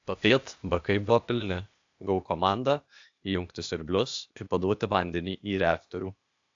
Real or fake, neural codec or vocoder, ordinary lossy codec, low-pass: fake; codec, 16 kHz, 0.8 kbps, ZipCodec; AAC, 64 kbps; 7.2 kHz